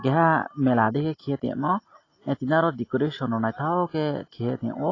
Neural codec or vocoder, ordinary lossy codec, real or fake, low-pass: none; AAC, 32 kbps; real; 7.2 kHz